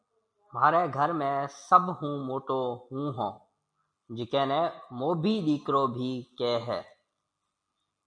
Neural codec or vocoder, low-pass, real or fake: none; 9.9 kHz; real